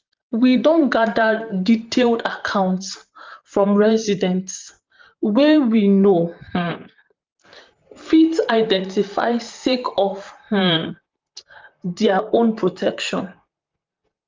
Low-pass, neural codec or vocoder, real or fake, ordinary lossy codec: 7.2 kHz; vocoder, 44.1 kHz, 128 mel bands, Pupu-Vocoder; fake; Opus, 24 kbps